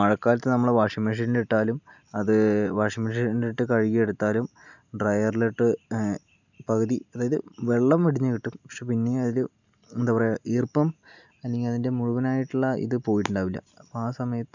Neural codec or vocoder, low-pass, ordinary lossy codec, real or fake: none; 7.2 kHz; none; real